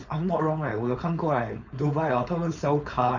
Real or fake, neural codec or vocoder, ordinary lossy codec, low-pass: fake; codec, 16 kHz, 4.8 kbps, FACodec; none; 7.2 kHz